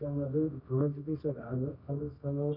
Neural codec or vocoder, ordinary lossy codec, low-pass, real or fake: codec, 24 kHz, 0.9 kbps, WavTokenizer, medium music audio release; none; 5.4 kHz; fake